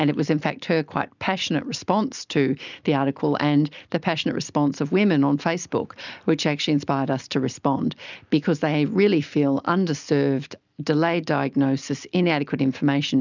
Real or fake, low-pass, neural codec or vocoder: real; 7.2 kHz; none